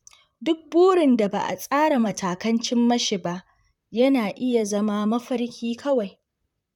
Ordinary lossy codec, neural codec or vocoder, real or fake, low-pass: none; vocoder, 44.1 kHz, 128 mel bands, Pupu-Vocoder; fake; 19.8 kHz